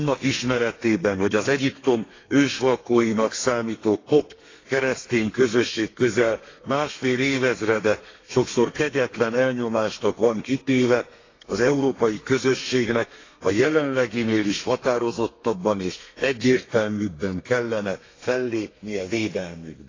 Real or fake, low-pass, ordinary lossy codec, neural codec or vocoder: fake; 7.2 kHz; AAC, 32 kbps; codec, 32 kHz, 1.9 kbps, SNAC